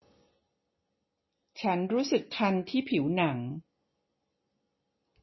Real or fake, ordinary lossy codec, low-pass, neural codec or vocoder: real; MP3, 24 kbps; 7.2 kHz; none